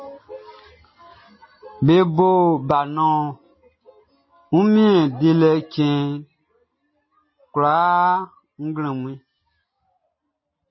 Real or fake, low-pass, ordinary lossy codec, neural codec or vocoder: real; 7.2 kHz; MP3, 24 kbps; none